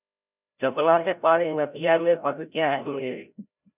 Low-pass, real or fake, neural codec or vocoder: 3.6 kHz; fake; codec, 16 kHz, 0.5 kbps, FreqCodec, larger model